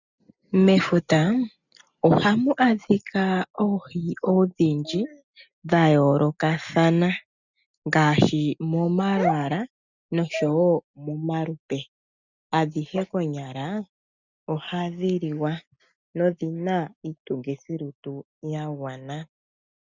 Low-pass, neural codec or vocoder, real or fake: 7.2 kHz; none; real